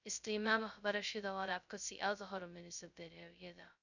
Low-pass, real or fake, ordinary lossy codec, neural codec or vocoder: 7.2 kHz; fake; none; codec, 16 kHz, 0.2 kbps, FocalCodec